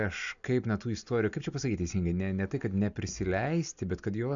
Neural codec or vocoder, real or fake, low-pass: none; real; 7.2 kHz